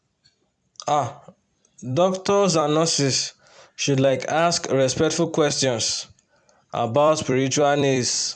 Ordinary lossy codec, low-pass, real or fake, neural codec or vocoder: none; 9.9 kHz; fake; vocoder, 44.1 kHz, 128 mel bands every 256 samples, BigVGAN v2